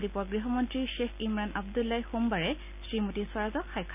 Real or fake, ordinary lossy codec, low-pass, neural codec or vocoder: real; none; 3.6 kHz; none